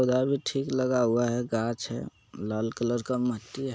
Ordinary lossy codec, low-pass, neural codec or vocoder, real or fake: none; none; none; real